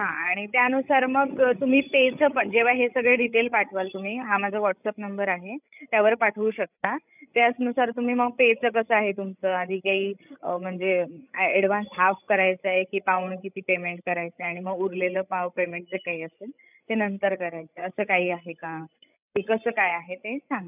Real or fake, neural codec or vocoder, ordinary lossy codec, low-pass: fake; codec, 16 kHz, 16 kbps, FreqCodec, larger model; none; 3.6 kHz